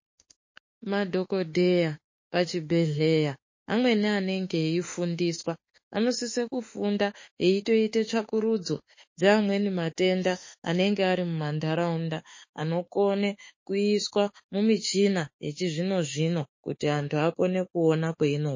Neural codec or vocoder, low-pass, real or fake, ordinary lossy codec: autoencoder, 48 kHz, 32 numbers a frame, DAC-VAE, trained on Japanese speech; 7.2 kHz; fake; MP3, 32 kbps